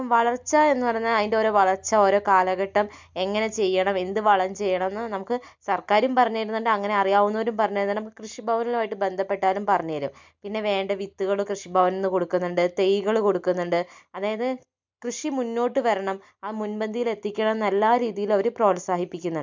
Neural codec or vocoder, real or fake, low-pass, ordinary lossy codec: none; real; 7.2 kHz; MP3, 64 kbps